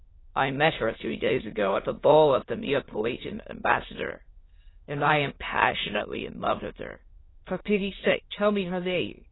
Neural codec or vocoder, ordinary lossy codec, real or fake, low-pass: autoencoder, 22.05 kHz, a latent of 192 numbers a frame, VITS, trained on many speakers; AAC, 16 kbps; fake; 7.2 kHz